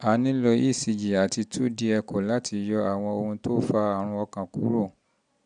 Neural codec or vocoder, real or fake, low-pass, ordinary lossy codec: none; real; 9.9 kHz; none